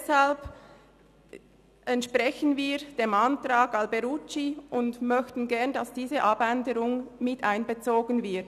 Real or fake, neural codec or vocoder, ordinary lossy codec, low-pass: real; none; none; 14.4 kHz